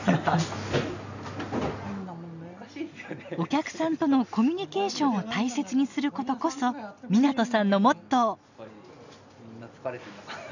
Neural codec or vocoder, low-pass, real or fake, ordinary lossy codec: none; 7.2 kHz; real; none